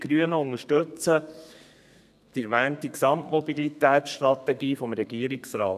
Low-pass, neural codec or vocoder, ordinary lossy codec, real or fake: 14.4 kHz; codec, 32 kHz, 1.9 kbps, SNAC; none; fake